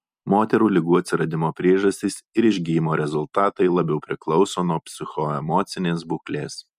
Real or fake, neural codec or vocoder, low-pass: real; none; 14.4 kHz